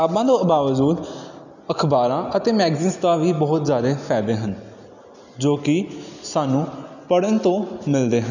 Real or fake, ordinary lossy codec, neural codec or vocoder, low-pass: real; none; none; 7.2 kHz